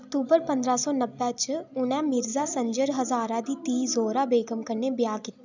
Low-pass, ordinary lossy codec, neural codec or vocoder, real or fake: 7.2 kHz; none; none; real